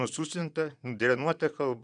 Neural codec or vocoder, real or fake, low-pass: vocoder, 22.05 kHz, 80 mel bands, Vocos; fake; 9.9 kHz